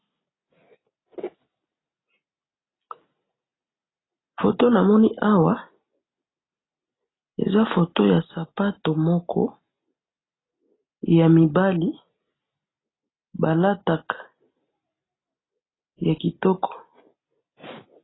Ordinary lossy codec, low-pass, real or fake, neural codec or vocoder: AAC, 16 kbps; 7.2 kHz; real; none